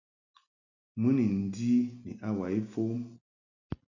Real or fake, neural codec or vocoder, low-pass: real; none; 7.2 kHz